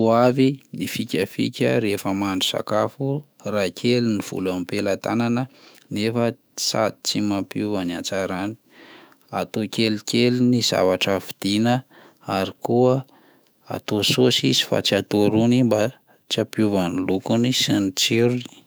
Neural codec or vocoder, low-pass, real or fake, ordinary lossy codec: autoencoder, 48 kHz, 128 numbers a frame, DAC-VAE, trained on Japanese speech; none; fake; none